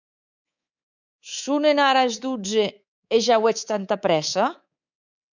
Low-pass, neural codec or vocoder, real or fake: 7.2 kHz; codec, 24 kHz, 3.1 kbps, DualCodec; fake